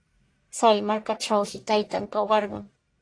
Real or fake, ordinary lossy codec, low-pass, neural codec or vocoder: fake; AAC, 48 kbps; 9.9 kHz; codec, 44.1 kHz, 1.7 kbps, Pupu-Codec